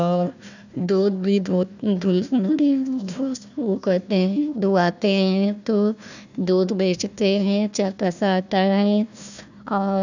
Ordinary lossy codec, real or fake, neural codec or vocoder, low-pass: none; fake; codec, 16 kHz, 1 kbps, FunCodec, trained on Chinese and English, 50 frames a second; 7.2 kHz